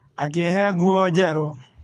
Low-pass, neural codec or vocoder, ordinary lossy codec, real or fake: none; codec, 24 kHz, 3 kbps, HILCodec; none; fake